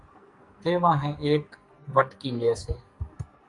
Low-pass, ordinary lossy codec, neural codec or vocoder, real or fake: 10.8 kHz; Opus, 64 kbps; codec, 44.1 kHz, 2.6 kbps, SNAC; fake